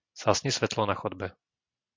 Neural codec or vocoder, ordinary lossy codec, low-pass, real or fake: none; MP3, 48 kbps; 7.2 kHz; real